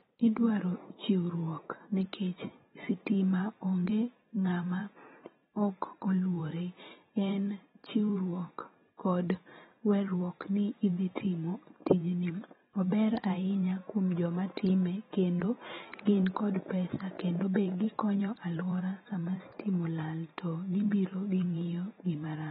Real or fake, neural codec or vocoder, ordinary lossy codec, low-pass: fake; vocoder, 44.1 kHz, 128 mel bands every 512 samples, BigVGAN v2; AAC, 16 kbps; 19.8 kHz